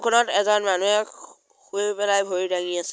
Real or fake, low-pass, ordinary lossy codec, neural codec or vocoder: real; none; none; none